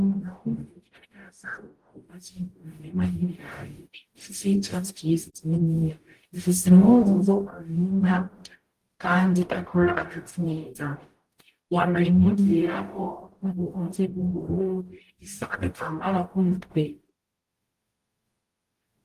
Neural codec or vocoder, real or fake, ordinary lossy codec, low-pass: codec, 44.1 kHz, 0.9 kbps, DAC; fake; Opus, 24 kbps; 14.4 kHz